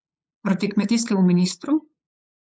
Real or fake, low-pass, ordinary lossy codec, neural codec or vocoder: fake; none; none; codec, 16 kHz, 8 kbps, FunCodec, trained on LibriTTS, 25 frames a second